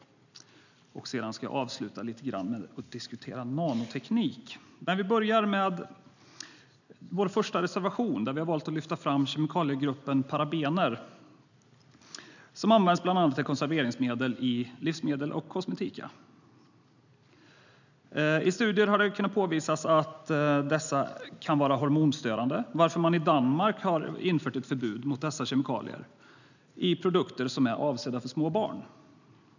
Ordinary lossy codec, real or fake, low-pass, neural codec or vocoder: none; real; 7.2 kHz; none